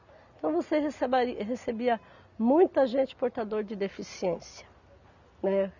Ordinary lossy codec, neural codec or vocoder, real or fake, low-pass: none; none; real; 7.2 kHz